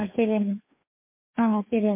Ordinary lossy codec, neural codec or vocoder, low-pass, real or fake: MP3, 24 kbps; codec, 16 kHz, 2 kbps, FreqCodec, larger model; 3.6 kHz; fake